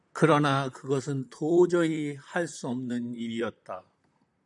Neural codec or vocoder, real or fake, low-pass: vocoder, 44.1 kHz, 128 mel bands, Pupu-Vocoder; fake; 10.8 kHz